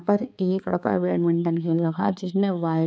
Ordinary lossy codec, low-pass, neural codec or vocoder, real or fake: none; none; codec, 16 kHz, 4 kbps, X-Codec, HuBERT features, trained on balanced general audio; fake